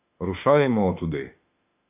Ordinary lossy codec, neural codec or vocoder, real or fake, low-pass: AAC, 32 kbps; autoencoder, 48 kHz, 32 numbers a frame, DAC-VAE, trained on Japanese speech; fake; 3.6 kHz